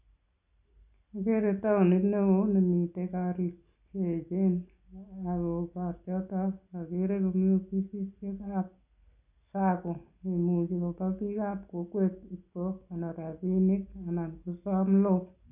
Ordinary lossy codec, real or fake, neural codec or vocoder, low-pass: none; real; none; 3.6 kHz